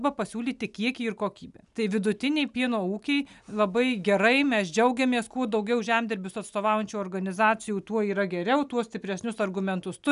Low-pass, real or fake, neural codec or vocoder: 10.8 kHz; real; none